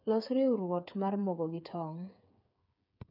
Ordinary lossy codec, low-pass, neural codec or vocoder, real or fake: none; 5.4 kHz; codec, 16 kHz, 8 kbps, FreqCodec, smaller model; fake